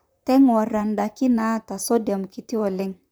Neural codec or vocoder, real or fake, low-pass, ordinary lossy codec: vocoder, 44.1 kHz, 128 mel bands, Pupu-Vocoder; fake; none; none